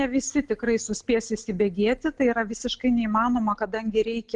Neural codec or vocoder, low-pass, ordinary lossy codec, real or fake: none; 10.8 kHz; Opus, 24 kbps; real